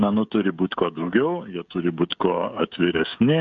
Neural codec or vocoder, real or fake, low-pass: codec, 16 kHz, 16 kbps, FreqCodec, smaller model; fake; 7.2 kHz